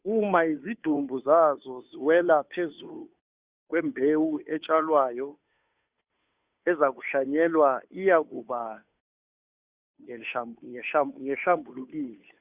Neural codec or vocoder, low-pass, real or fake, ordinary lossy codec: codec, 16 kHz, 2 kbps, FunCodec, trained on Chinese and English, 25 frames a second; 3.6 kHz; fake; Opus, 64 kbps